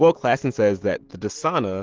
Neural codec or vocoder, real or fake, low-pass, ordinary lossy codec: none; real; 7.2 kHz; Opus, 16 kbps